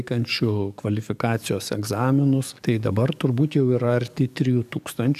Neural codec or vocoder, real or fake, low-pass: codec, 44.1 kHz, 7.8 kbps, DAC; fake; 14.4 kHz